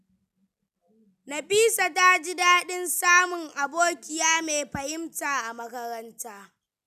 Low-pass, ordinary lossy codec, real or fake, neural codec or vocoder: 14.4 kHz; none; real; none